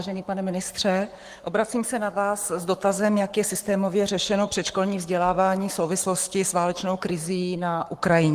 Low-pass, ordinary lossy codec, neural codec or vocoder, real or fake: 14.4 kHz; Opus, 24 kbps; codec, 44.1 kHz, 7.8 kbps, Pupu-Codec; fake